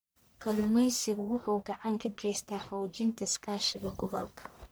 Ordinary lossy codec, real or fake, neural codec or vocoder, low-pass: none; fake; codec, 44.1 kHz, 1.7 kbps, Pupu-Codec; none